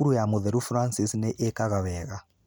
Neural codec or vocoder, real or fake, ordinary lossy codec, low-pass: none; real; none; none